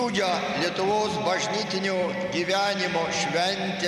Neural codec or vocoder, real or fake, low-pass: none; real; 14.4 kHz